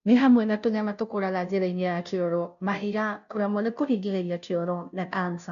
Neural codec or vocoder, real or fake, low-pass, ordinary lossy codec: codec, 16 kHz, 0.5 kbps, FunCodec, trained on Chinese and English, 25 frames a second; fake; 7.2 kHz; none